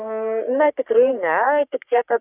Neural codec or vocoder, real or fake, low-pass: codec, 44.1 kHz, 3.4 kbps, Pupu-Codec; fake; 3.6 kHz